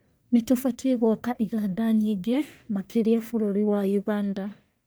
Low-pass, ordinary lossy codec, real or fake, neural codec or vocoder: none; none; fake; codec, 44.1 kHz, 1.7 kbps, Pupu-Codec